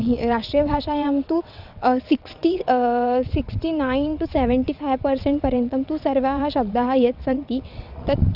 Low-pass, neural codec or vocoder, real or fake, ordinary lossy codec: 5.4 kHz; vocoder, 22.05 kHz, 80 mel bands, WaveNeXt; fake; none